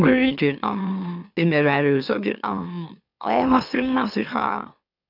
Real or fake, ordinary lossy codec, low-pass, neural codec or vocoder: fake; none; 5.4 kHz; autoencoder, 44.1 kHz, a latent of 192 numbers a frame, MeloTTS